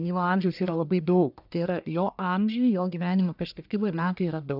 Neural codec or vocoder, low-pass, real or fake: codec, 44.1 kHz, 1.7 kbps, Pupu-Codec; 5.4 kHz; fake